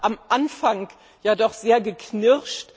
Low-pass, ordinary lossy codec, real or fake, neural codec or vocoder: none; none; real; none